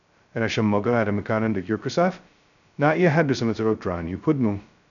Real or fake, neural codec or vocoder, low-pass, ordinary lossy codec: fake; codec, 16 kHz, 0.2 kbps, FocalCodec; 7.2 kHz; none